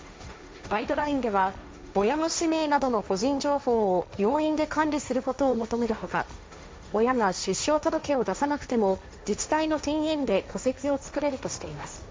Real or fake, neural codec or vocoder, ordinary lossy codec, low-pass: fake; codec, 16 kHz, 1.1 kbps, Voila-Tokenizer; none; none